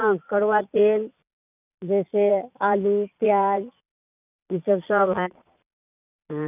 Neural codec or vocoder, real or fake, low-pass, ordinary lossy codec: vocoder, 22.05 kHz, 80 mel bands, Vocos; fake; 3.6 kHz; none